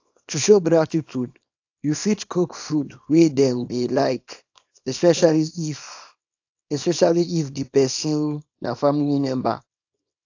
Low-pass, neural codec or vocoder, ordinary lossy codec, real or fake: 7.2 kHz; codec, 24 kHz, 0.9 kbps, WavTokenizer, small release; AAC, 48 kbps; fake